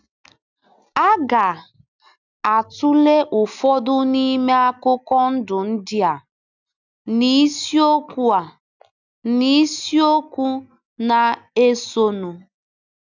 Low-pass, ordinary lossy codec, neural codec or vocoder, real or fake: 7.2 kHz; none; none; real